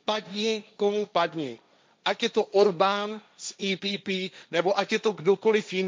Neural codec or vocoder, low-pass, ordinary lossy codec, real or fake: codec, 16 kHz, 1.1 kbps, Voila-Tokenizer; none; none; fake